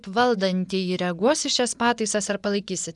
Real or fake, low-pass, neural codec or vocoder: fake; 10.8 kHz; vocoder, 24 kHz, 100 mel bands, Vocos